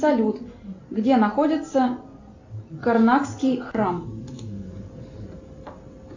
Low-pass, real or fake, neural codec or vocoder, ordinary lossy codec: 7.2 kHz; real; none; AAC, 48 kbps